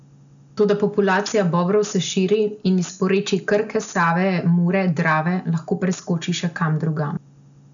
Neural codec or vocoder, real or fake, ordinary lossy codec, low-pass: none; real; none; 7.2 kHz